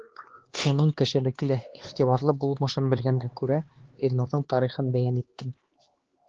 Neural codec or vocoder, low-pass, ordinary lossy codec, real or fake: codec, 16 kHz, 2 kbps, X-Codec, HuBERT features, trained on LibriSpeech; 7.2 kHz; Opus, 16 kbps; fake